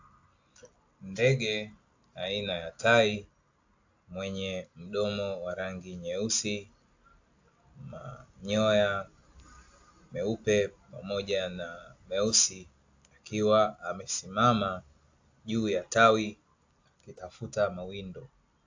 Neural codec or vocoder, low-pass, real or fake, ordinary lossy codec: none; 7.2 kHz; real; AAC, 48 kbps